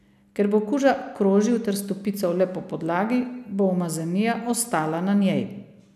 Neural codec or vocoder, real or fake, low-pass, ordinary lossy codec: none; real; 14.4 kHz; none